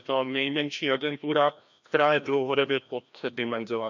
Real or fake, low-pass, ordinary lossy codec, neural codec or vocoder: fake; 7.2 kHz; none; codec, 16 kHz, 1 kbps, FreqCodec, larger model